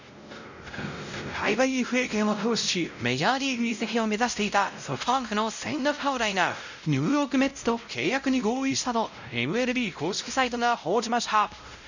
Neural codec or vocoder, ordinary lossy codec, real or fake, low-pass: codec, 16 kHz, 0.5 kbps, X-Codec, WavLM features, trained on Multilingual LibriSpeech; none; fake; 7.2 kHz